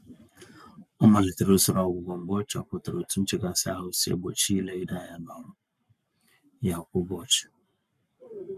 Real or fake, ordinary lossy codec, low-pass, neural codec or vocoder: fake; none; 14.4 kHz; codec, 44.1 kHz, 7.8 kbps, Pupu-Codec